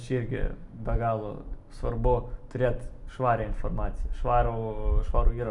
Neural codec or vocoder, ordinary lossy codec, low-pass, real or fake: none; MP3, 96 kbps; 10.8 kHz; real